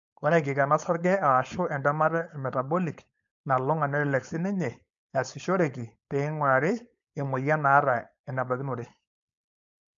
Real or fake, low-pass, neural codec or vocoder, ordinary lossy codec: fake; 7.2 kHz; codec, 16 kHz, 4.8 kbps, FACodec; MP3, 64 kbps